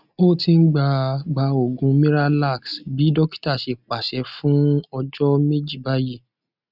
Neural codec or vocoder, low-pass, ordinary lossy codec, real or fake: none; 5.4 kHz; none; real